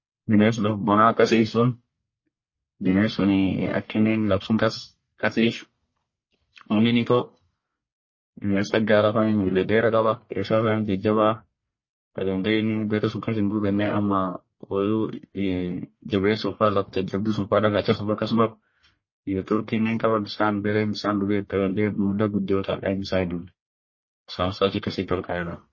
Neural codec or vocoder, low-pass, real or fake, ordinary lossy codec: codec, 44.1 kHz, 1.7 kbps, Pupu-Codec; 7.2 kHz; fake; MP3, 32 kbps